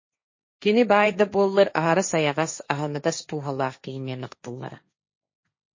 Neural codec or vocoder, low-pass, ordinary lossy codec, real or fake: codec, 16 kHz, 1.1 kbps, Voila-Tokenizer; 7.2 kHz; MP3, 32 kbps; fake